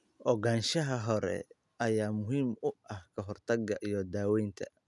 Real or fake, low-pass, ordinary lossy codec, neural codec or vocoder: real; 10.8 kHz; none; none